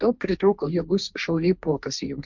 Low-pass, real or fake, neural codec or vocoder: 7.2 kHz; fake; codec, 16 kHz, 1.1 kbps, Voila-Tokenizer